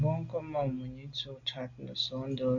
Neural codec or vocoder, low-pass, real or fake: none; 7.2 kHz; real